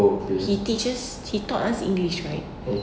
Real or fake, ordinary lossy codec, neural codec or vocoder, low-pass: real; none; none; none